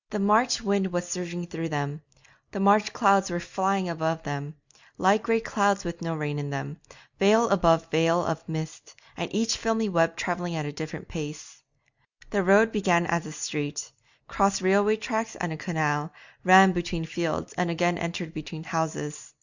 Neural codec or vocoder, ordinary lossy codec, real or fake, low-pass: none; Opus, 64 kbps; real; 7.2 kHz